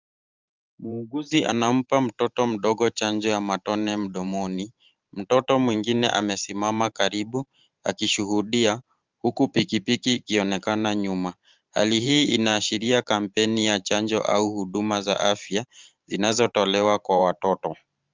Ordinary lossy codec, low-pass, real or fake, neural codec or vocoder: Opus, 32 kbps; 7.2 kHz; fake; vocoder, 44.1 kHz, 128 mel bands every 512 samples, BigVGAN v2